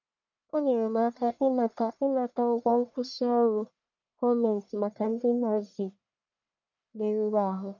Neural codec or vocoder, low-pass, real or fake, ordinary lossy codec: codec, 44.1 kHz, 1.7 kbps, Pupu-Codec; 7.2 kHz; fake; none